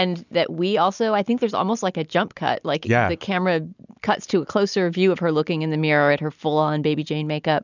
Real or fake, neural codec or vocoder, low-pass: real; none; 7.2 kHz